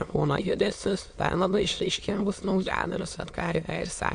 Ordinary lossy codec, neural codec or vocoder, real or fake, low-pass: AAC, 64 kbps; autoencoder, 22.05 kHz, a latent of 192 numbers a frame, VITS, trained on many speakers; fake; 9.9 kHz